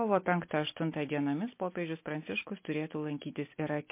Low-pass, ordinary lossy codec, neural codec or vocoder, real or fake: 3.6 kHz; MP3, 24 kbps; none; real